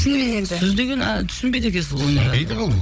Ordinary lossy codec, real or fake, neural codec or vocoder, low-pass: none; fake; codec, 16 kHz, 16 kbps, FunCodec, trained on Chinese and English, 50 frames a second; none